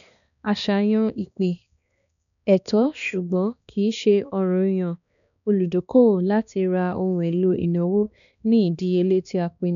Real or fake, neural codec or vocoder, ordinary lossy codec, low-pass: fake; codec, 16 kHz, 2 kbps, X-Codec, HuBERT features, trained on balanced general audio; none; 7.2 kHz